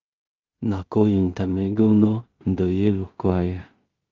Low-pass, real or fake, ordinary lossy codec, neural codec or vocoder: 7.2 kHz; fake; Opus, 24 kbps; codec, 16 kHz in and 24 kHz out, 0.4 kbps, LongCat-Audio-Codec, two codebook decoder